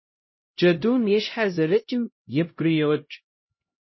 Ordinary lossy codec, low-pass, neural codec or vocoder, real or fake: MP3, 24 kbps; 7.2 kHz; codec, 16 kHz, 0.5 kbps, X-Codec, HuBERT features, trained on LibriSpeech; fake